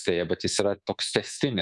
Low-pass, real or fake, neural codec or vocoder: 10.8 kHz; fake; codec, 24 kHz, 3.1 kbps, DualCodec